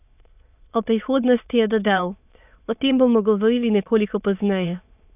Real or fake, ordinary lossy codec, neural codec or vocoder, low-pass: fake; none; autoencoder, 22.05 kHz, a latent of 192 numbers a frame, VITS, trained on many speakers; 3.6 kHz